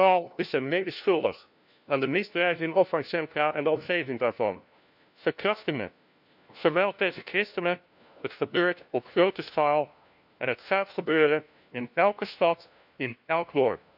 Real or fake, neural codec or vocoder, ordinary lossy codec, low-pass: fake; codec, 16 kHz, 1 kbps, FunCodec, trained on LibriTTS, 50 frames a second; none; 5.4 kHz